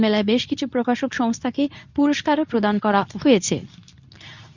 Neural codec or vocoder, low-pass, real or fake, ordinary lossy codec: codec, 24 kHz, 0.9 kbps, WavTokenizer, medium speech release version 2; 7.2 kHz; fake; none